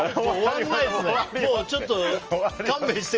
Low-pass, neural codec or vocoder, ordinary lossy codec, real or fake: 7.2 kHz; none; Opus, 24 kbps; real